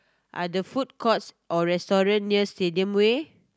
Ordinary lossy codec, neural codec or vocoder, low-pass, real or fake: none; none; none; real